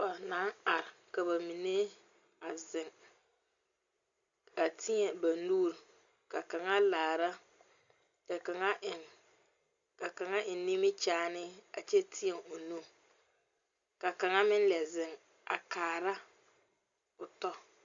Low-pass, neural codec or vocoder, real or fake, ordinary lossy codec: 7.2 kHz; none; real; Opus, 64 kbps